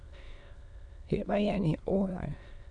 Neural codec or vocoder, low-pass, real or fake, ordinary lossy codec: autoencoder, 22.05 kHz, a latent of 192 numbers a frame, VITS, trained on many speakers; 9.9 kHz; fake; MP3, 96 kbps